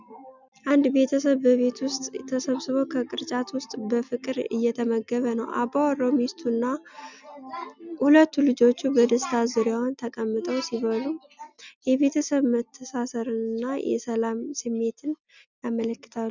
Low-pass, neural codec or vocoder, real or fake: 7.2 kHz; none; real